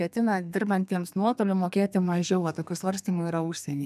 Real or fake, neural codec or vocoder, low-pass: fake; codec, 32 kHz, 1.9 kbps, SNAC; 14.4 kHz